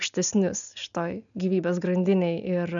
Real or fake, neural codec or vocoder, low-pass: real; none; 7.2 kHz